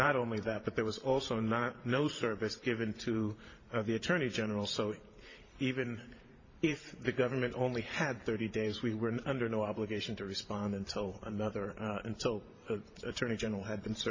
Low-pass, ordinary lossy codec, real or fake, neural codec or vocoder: 7.2 kHz; MP3, 32 kbps; fake; vocoder, 44.1 kHz, 128 mel bands every 512 samples, BigVGAN v2